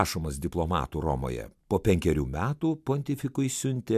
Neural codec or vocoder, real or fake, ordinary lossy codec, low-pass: none; real; MP3, 96 kbps; 14.4 kHz